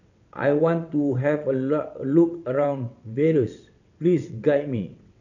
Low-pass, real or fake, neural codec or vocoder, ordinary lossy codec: 7.2 kHz; fake; vocoder, 22.05 kHz, 80 mel bands, WaveNeXt; none